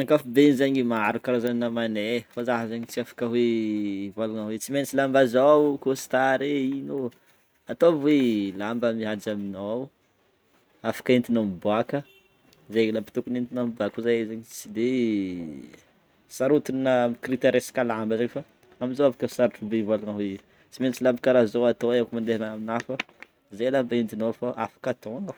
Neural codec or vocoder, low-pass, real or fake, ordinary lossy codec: none; none; real; none